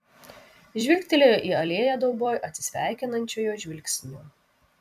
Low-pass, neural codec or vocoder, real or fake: 14.4 kHz; vocoder, 44.1 kHz, 128 mel bands every 256 samples, BigVGAN v2; fake